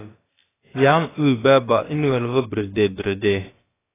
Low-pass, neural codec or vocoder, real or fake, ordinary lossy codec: 3.6 kHz; codec, 16 kHz, about 1 kbps, DyCAST, with the encoder's durations; fake; AAC, 16 kbps